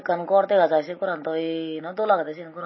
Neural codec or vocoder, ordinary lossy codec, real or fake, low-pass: none; MP3, 24 kbps; real; 7.2 kHz